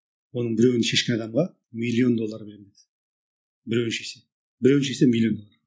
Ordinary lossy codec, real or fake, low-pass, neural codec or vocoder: none; real; none; none